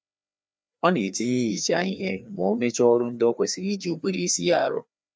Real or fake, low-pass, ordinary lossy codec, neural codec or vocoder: fake; none; none; codec, 16 kHz, 2 kbps, FreqCodec, larger model